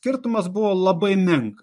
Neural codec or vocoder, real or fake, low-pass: vocoder, 24 kHz, 100 mel bands, Vocos; fake; 10.8 kHz